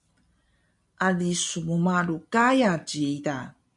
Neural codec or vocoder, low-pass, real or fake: none; 10.8 kHz; real